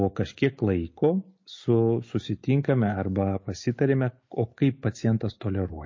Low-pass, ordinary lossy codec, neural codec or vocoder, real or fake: 7.2 kHz; MP3, 32 kbps; none; real